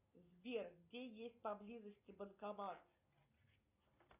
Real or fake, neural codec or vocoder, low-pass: real; none; 3.6 kHz